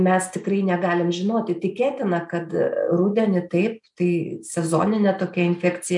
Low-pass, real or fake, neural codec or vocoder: 10.8 kHz; real; none